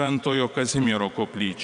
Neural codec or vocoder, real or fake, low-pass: vocoder, 22.05 kHz, 80 mel bands, WaveNeXt; fake; 9.9 kHz